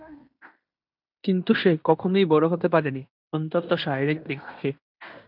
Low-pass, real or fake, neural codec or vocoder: 5.4 kHz; fake; codec, 16 kHz in and 24 kHz out, 0.9 kbps, LongCat-Audio-Codec, fine tuned four codebook decoder